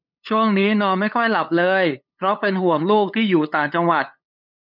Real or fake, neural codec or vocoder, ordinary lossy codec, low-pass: fake; codec, 16 kHz, 8 kbps, FunCodec, trained on LibriTTS, 25 frames a second; none; 5.4 kHz